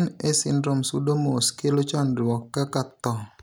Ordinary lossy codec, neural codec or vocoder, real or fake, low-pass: none; none; real; none